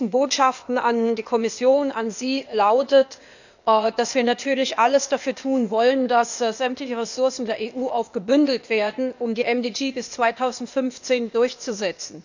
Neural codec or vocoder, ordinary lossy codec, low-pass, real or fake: codec, 16 kHz, 0.8 kbps, ZipCodec; none; 7.2 kHz; fake